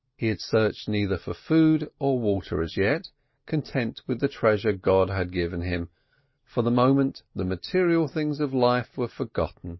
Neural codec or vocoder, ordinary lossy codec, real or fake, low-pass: none; MP3, 24 kbps; real; 7.2 kHz